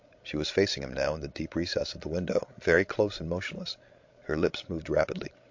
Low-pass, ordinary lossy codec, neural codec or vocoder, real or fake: 7.2 kHz; MP3, 48 kbps; codec, 16 kHz, 16 kbps, FreqCodec, larger model; fake